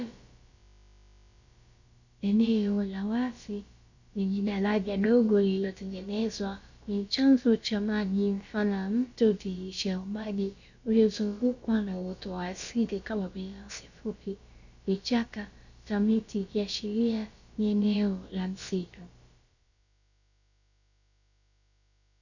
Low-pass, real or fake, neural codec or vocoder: 7.2 kHz; fake; codec, 16 kHz, about 1 kbps, DyCAST, with the encoder's durations